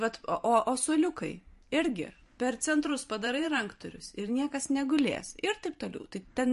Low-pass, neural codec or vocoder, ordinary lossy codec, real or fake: 14.4 kHz; vocoder, 44.1 kHz, 128 mel bands every 512 samples, BigVGAN v2; MP3, 48 kbps; fake